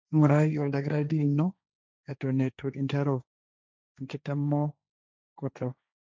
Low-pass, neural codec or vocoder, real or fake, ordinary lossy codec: none; codec, 16 kHz, 1.1 kbps, Voila-Tokenizer; fake; none